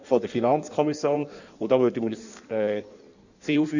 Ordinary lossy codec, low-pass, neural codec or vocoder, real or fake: none; 7.2 kHz; codec, 16 kHz in and 24 kHz out, 1.1 kbps, FireRedTTS-2 codec; fake